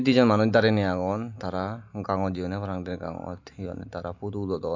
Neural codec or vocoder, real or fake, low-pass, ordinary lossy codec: none; real; 7.2 kHz; AAC, 48 kbps